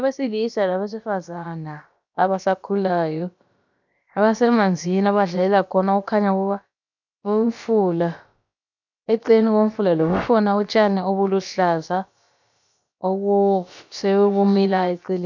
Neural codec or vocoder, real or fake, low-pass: codec, 16 kHz, about 1 kbps, DyCAST, with the encoder's durations; fake; 7.2 kHz